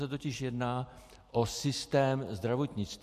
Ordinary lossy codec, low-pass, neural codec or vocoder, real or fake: MP3, 64 kbps; 14.4 kHz; none; real